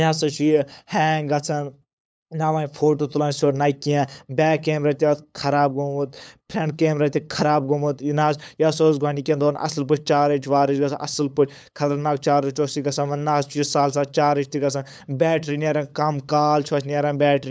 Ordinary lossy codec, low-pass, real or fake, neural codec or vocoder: none; none; fake; codec, 16 kHz, 8 kbps, FunCodec, trained on LibriTTS, 25 frames a second